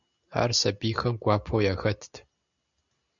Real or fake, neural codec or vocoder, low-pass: real; none; 7.2 kHz